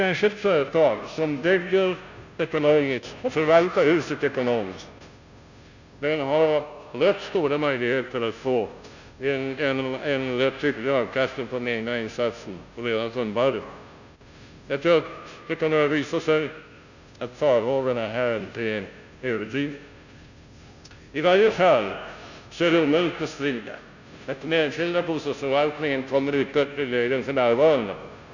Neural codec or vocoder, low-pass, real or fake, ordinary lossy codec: codec, 16 kHz, 0.5 kbps, FunCodec, trained on Chinese and English, 25 frames a second; 7.2 kHz; fake; none